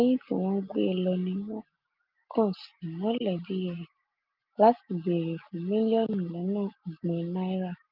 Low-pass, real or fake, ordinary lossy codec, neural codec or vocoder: 5.4 kHz; real; Opus, 32 kbps; none